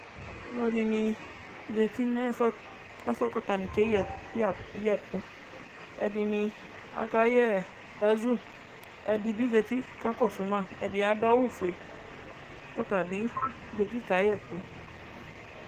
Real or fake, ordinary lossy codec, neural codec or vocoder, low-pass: fake; Opus, 16 kbps; codec, 44.1 kHz, 2.6 kbps, SNAC; 14.4 kHz